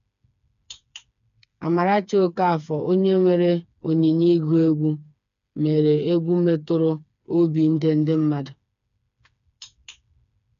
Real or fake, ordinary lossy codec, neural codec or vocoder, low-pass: fake; none; codec, 16 kHz, 4 kbps, FreqCodec, smaller model; 7.2 kHz